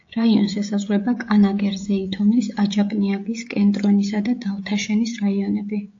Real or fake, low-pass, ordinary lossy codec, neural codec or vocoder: fake; 7.2 kHz; AAC, 48 kbps; codec, 16 kHz, 16 kbps, FreqCodec, smaller model